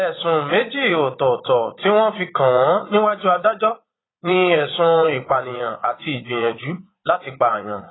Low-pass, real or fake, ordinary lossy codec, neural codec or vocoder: 7.2 kHz; fake; AAC, 16 kbps; vocoder, 22.05 kHz, 80 mel bands, Vocos